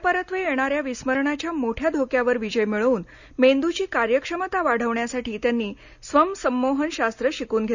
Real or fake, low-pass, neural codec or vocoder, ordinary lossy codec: real; 7.2 kHz; none; none